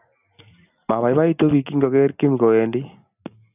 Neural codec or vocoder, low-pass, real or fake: none; 3.6 kHz; real